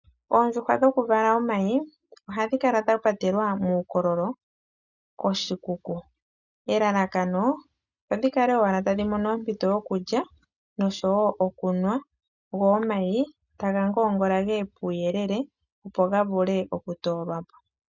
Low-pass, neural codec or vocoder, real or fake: 7.2 kHz; none; real